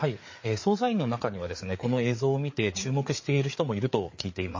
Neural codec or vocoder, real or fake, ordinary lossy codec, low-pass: codec, 16 kHz, 4 kbps, FreqCodec, larger model; fake; MP3, 32 kbps; 7.2 kHz